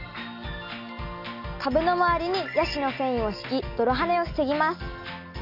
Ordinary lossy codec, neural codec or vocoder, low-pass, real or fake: none; none; 5.4 kHz; real